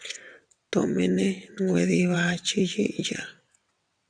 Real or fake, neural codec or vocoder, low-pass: fake; vocoder, 22.05 kHz, 80 mel bands, WaveNeXt; 9.9 kHz